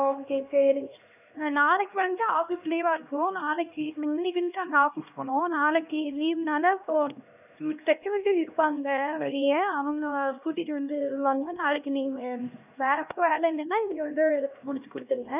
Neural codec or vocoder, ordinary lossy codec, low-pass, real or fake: codec, 16 kHz, 1 kbps, X-Codec, HuBERT features, trained on LibriSpeech; none; 3.6 kHz; fake